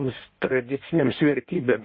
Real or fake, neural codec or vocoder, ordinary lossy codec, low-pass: fake; codec, 16 kHz in and 24 kHz out, 1.1 kbps, FireRedTTS-2 codec; MP3, 24 kbps; 7.2 kHz